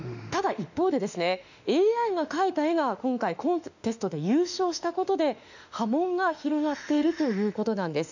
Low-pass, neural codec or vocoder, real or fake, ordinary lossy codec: 7.2 kHz; autoencoder, 48 kHz, 32 numbers a frame, DAC-VAE, trained on Japanese speech; fake; none